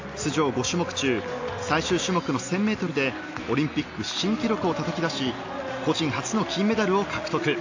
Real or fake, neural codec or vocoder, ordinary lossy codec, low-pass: real; none; AAC, 48 kbps; 7.2 kHz